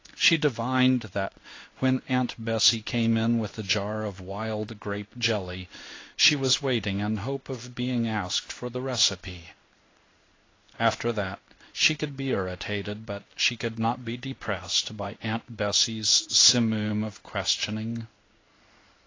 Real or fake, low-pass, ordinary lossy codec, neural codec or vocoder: fake; 7.2 kHz; AAC, 32 kbps; codec, 16 kHz in and 24 kHz out, 1 kbps, XY-Tokenizer